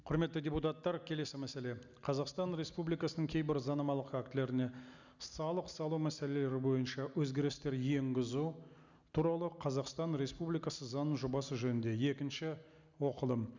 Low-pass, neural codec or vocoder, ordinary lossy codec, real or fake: 7.2 kHz; none; none; real